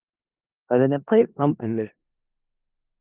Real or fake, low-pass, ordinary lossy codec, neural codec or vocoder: fake; 3.6 kHz; Opus, 32 kbps; codec, 16 kHz in and 24 kHz out, 0.4 kbps, LongCat-Audio-Codec, four codebook decoder